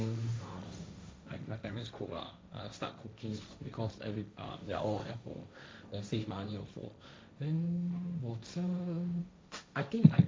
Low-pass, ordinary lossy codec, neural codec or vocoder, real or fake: none; none; codec, 16 kHz, 1.1 kbps, Voila-Tokenizer; fake